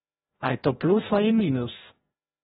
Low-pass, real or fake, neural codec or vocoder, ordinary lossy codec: 7.2 kHz; fake; codec, 16 kHz, 0.5 kbps, FreqCodec, larger model; AAC, 16 kbps